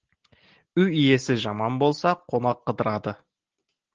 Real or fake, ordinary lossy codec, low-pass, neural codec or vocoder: real; Opus, 16 kbps; 7.2 kHz; none